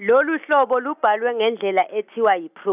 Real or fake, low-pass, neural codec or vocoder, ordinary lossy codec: real; 3.6 kHz; none; none